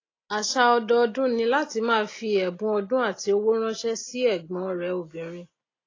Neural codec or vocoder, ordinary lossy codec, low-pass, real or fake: none; AAC, 32 kbps; 7.2 kHz; real